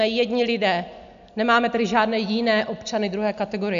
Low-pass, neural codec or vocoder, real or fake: 7.2 kHz; none; real